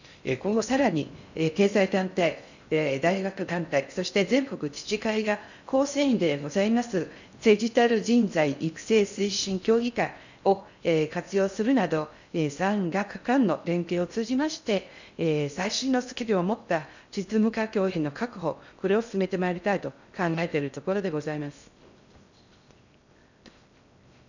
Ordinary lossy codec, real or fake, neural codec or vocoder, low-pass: none; fake; codec, 16 kHz in and 24 kHz out, 0.6 kbps, FocalCodec, streaming, 4096 codes; 7.2 kHz